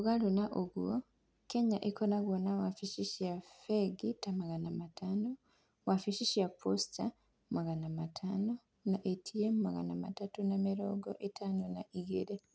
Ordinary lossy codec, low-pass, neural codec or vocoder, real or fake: none; none; none; real